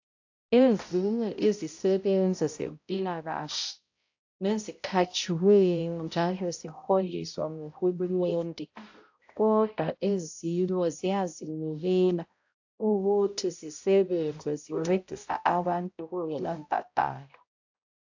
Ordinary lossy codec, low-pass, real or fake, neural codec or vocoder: AAC, 48 kbps; 7.2 kHz; fake; codec, 16 kHz, 0.5 kbps, X-Codec, HuBERT features, trained on balanced general audio